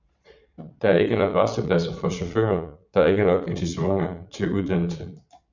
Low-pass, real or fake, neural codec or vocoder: 7.2 kHz; fake; vocoder, 22.05 kHz, 80 mel bands, Vocos